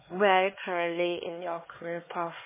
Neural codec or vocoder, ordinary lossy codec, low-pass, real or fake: codec, 16 kHz, 4 kbps, X-Codec, HuBERT features, trained on LibriSpeech; MP3, 16 kbps; 3.6 kHz; fake